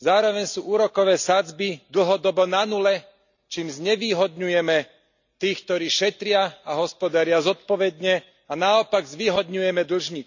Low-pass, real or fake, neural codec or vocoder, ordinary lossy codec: 7.2 kHz; real; none; none